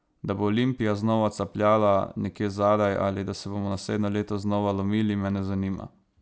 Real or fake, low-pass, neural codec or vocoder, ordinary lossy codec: real; none; none; none